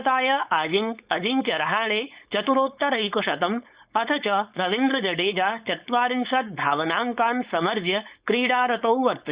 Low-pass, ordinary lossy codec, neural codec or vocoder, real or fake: 3.6 kHz; Opus, 64 kbps; codec, 16 kHz, 4.8 kbps, FACodec; fake